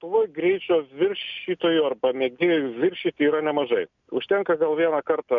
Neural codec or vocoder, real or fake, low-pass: none; real; 7.2 kHz